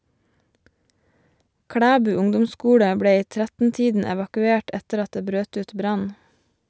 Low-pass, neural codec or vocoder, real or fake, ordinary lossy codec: none; none; real; none